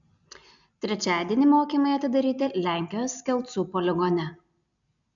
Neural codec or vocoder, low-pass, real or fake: none; 7.2 kHz; real